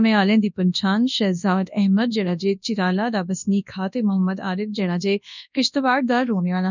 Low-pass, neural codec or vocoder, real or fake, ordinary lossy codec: 7.2 kHz; codec, 24 kHz, 0.9 kbps, WavTokenizer, large speech release; fake; none